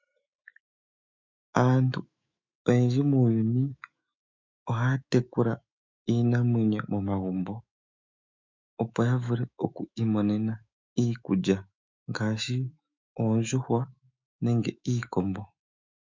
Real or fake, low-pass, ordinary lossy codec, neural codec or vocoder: fake; 7.2 kHz; MP3, 64 kbps; autoencoder, 48 kHz, 128 numbers a frame, DAC-VAE, trained on Japanese speech